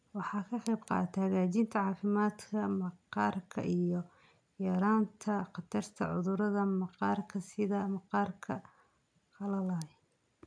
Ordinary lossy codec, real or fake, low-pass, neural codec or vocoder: none; real; 9.9 kHz; none